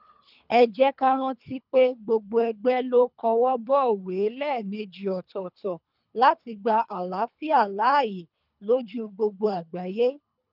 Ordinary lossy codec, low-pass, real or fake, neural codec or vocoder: none; 5.4 kHz; fake; codec, 24 kHz, 3 kbps, HILCodec